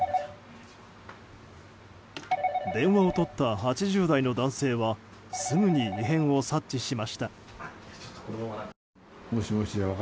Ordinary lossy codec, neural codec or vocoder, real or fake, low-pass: none; none; real; none